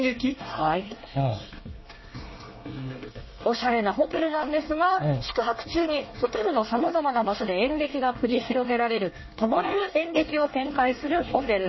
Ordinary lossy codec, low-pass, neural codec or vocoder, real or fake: MP3, 24 kbps; 7.2 kHz; codec, 24 kHz, 1 kbps, SNAC; fake